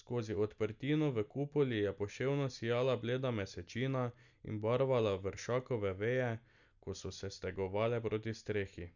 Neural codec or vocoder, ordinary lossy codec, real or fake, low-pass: none; none; real; 7.2 kHz